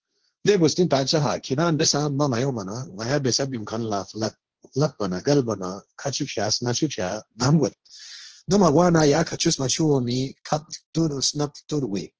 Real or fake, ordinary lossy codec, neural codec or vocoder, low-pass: fake; Opus, 16 kbps; codec, 16 kHz, 1.1 kbps, Voila-Tokenizer; 7.2 kHz